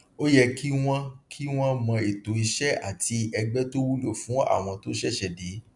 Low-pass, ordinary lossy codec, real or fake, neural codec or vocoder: 10.8 kHz; none; real; none